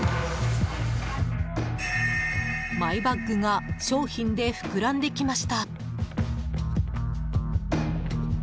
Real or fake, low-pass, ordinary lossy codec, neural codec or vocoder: real; none; none; none